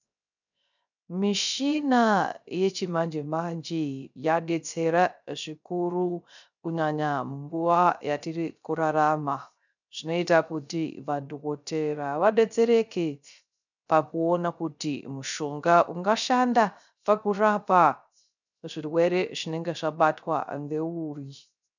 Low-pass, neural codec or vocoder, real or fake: 7.2 kHz; codec, 16 kHz, 0.3 kbps, FocalCodec; fake